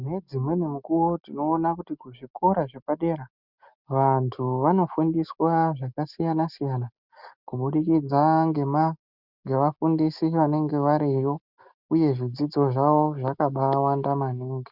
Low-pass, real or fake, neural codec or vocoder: 5.4 kHz; real; none